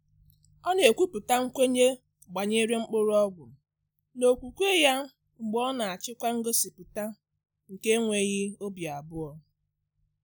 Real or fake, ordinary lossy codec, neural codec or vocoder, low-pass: real; none; none; none